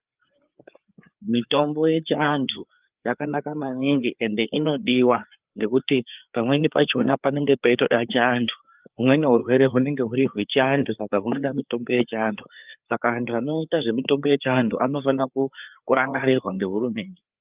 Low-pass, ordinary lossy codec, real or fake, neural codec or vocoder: 3.6 kHz; Opus, 32 kbps; fake; codec, 16 kHz, 4 kbps, FreqCodec, larger model